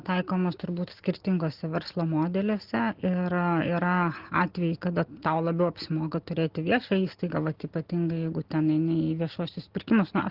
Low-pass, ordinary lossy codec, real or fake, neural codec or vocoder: 5.4 kHz; Opus, 16 kbps; real; none